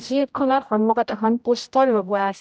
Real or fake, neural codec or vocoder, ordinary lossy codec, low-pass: fake; codec, 16 kHz, 0.5 kbps, X-Codec, HuBERT features, trained on general audio; none; none